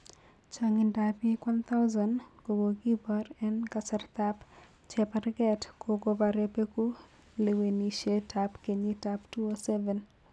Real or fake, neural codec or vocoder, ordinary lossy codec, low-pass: real; none; none; none